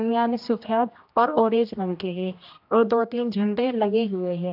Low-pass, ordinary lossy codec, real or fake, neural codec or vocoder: 5.4 kHz; AAC, 48 kbps; fake; codec, 16 kHz, 1 kbps, X-Codec, HuBERT features, trained on general audio